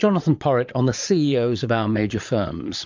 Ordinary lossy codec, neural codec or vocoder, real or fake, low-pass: MP3, 64 kbps; vocoder, 22.05 kHz, 80 mel bands, Vocos; fake; 7.2 kHz